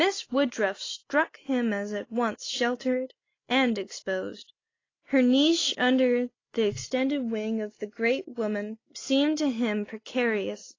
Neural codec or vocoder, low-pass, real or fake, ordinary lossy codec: none; 7.2 kHz; real; AAC, 32 kbps